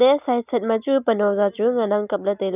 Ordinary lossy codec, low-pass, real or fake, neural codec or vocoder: none; 3.6 kHz; real; none